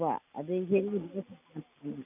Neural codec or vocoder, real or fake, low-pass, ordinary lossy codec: none; real; 3.6 kHz; none